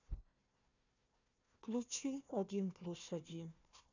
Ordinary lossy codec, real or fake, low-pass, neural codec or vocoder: none; fake; 7.2 kHz; codec, 16 kHz, 1 kbps, FunCodec, trained on Chinese and English, 50 frames a second